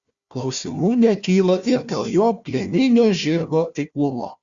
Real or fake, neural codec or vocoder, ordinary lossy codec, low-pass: fake; codec, 16 kHz, 1 kbps, FunCodec, trained on Chinese and English, 50 frames a second; Opus, 64 kbps; 7.2 kHz